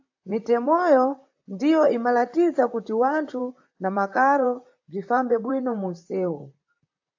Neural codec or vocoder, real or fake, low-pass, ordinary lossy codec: vocoder, 44.1 kHz, 128 mel bands, Pupu-Vocoder; fake; 7.2 kHz; AAC, 48 kbps